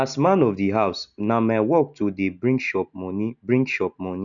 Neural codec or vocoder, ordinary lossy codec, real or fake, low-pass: none; none; real; 7.2 kHz